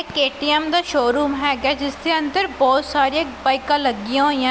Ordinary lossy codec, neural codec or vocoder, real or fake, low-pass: none; none; real; none